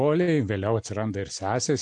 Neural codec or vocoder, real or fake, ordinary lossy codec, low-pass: none; real; AAC, 64 kbps; 9.9 kHz